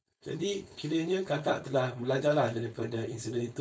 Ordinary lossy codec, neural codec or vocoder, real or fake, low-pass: none; codec, 16 kHz, 4.8 kbps, FACodec; fake; none